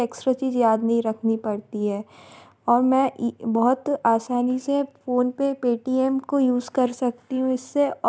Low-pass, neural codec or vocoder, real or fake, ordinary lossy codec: none; none; real; none